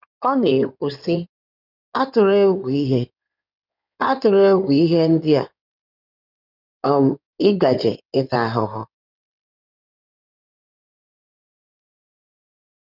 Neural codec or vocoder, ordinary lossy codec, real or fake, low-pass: codec, 16 kHz in and 24 kHz out, 2.2 kbps, FireRedTTS-2 codec; none; fake; 5.4 kHz